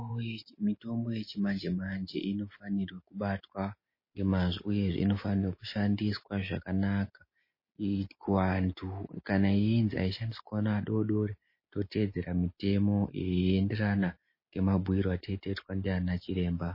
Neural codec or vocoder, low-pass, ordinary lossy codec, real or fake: none; 5.4 kHz; MP3, 24 kbps; real